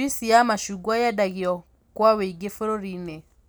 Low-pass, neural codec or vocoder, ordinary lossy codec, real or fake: none; none; none; real